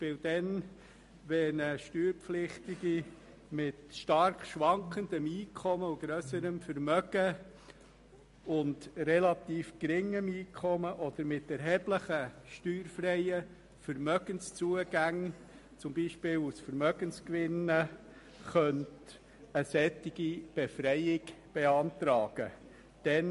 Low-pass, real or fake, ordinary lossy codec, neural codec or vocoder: 14.4 kHz; real; MP3, 48 kbps; none